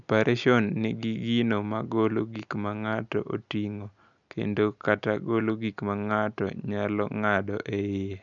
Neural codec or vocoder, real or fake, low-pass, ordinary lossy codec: none; real; 7.2 kHz; none